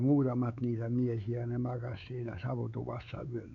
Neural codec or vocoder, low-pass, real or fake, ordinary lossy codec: codec, 16 kHz, 4 kbps, X-Codec, WavLM features, trained on Multilingual LibriSpeech; 7.2 kHz; fake; none